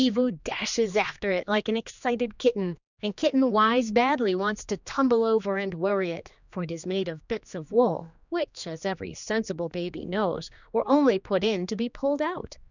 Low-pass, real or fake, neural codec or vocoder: 7.2 kHz; fake; codec, 16 kHz, 2 kbps, X-Codec, HuBERT features, trained on general audio